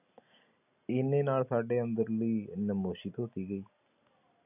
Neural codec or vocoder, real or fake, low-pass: none; real; 3.6 kHz